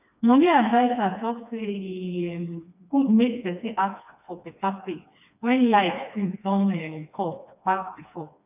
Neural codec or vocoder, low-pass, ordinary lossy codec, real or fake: codec, 16 kHz, 2 kbps, FreqCodec, smaller model; 3.6 kHz; none; fake